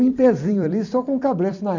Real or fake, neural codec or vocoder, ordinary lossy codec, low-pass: fake; vocoder, 44.1 kHz, 128 mel bands every 256 samples, BigVGAN v2; none; 7.2 kHz